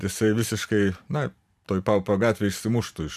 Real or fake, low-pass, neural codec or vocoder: fake; 14.4 kHz; vocoder, 44.1 kHz, 128 mel bands every 512 samples, BigVGAN v2